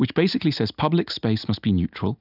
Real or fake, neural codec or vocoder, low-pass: real; none; 5.4 kHz